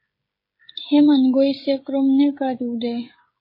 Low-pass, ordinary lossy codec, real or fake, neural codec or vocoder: 5.4 kHz; MP3, 24 kbps; fake; codec, 16 kHz, 16 kbps, FreqCodec, smaller model